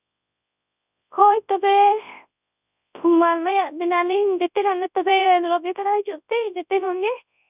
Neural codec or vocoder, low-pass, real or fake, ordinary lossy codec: codec, 24 kHz, 0.9 kbps, WavTokenizer, large speech release; 3.6 kHz; fake; none